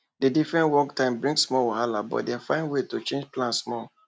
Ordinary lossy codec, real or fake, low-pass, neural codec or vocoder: none; real; none; none